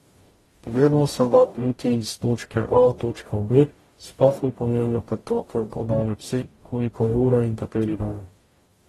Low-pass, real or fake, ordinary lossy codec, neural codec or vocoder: 19.8 kHz; fake; AAC, 32 kbps; codec, 44.1 kHz, 0.9 kbps, DAC